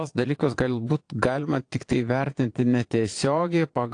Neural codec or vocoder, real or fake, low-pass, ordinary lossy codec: vocoder, 22.05 kHz, 80 mel bands, Vocos; fake; 9.9 kHz; AAC, 48 kbps